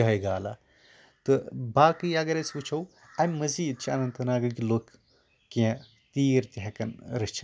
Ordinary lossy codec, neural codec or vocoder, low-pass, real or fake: none; none; none; real